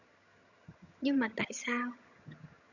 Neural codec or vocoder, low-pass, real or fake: vocoder, 22.05 kHz, 80 mel bands, HiFi-GAN; 7.2 kHz; fake